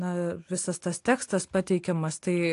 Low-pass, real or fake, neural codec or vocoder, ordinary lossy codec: 10.8 kHz; real; none; AAC, 48 kbps